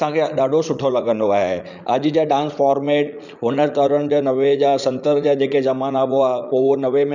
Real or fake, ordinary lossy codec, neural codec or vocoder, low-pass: fake; none; vocoder, 44.1 kHz, 80 mel bands, Vocos; 7.2 kHz